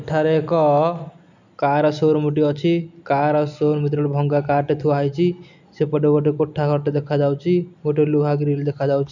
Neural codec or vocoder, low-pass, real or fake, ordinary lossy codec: none; 7.2 kHz; real; none